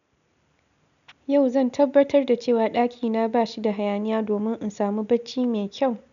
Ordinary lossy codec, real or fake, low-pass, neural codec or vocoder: none; real; 7.2 kHz; none